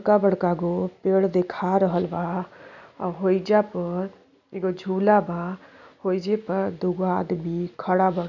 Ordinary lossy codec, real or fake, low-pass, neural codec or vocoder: none; real; 7.2 kHz; none